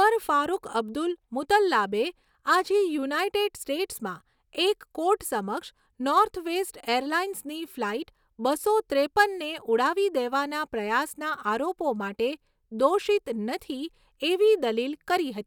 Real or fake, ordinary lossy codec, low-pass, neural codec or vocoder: real; none; 19.8 kHz; none